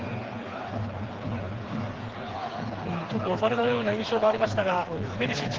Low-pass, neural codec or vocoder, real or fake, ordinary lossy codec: 7.2 kHz; codec, 16 kHz, 4 kbps, FreqCodec, smaller model; fake; Opus, 16 kbps